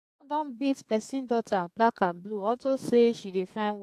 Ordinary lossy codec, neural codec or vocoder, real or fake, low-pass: AAC, 64 kbps; codec, 32 kHz, 1.9 kbps, SNAC; fake; 14.4 kHz